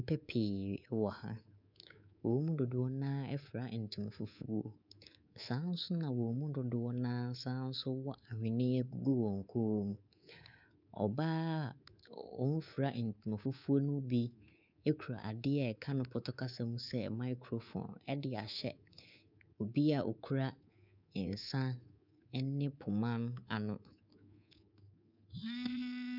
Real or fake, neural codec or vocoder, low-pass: fake; codec, 24 kHz, 3.1 kbps, DualCodec; 5.4 kHz